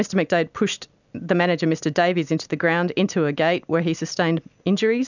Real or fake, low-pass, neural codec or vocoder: real; 7.2 kHz; none